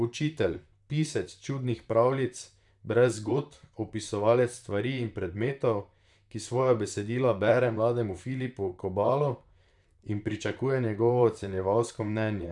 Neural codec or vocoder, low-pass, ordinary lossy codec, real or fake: vocoder, 44.1 kHz, 128 mel bands, Pupu-Vocoder; 10.8 kHz; none; fake